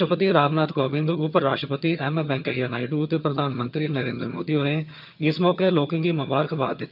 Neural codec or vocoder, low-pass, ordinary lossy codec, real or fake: vocoder, 22.05 kHz, 80 mel bands, HiFi-GAN; 5.4 kHz; none; fake